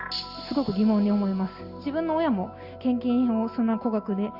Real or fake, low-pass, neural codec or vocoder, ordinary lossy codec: real; 5.4 kHz; none; Opus, 64 kbps